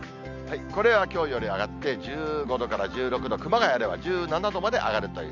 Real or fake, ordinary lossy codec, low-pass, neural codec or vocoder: real; none; 7.2 kHz; none